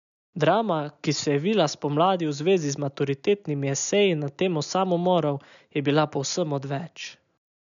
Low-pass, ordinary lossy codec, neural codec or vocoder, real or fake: 7.2 kHz; none; none; real